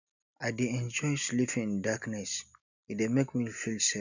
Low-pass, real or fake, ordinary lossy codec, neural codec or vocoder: 7.2 kHz; real; none; none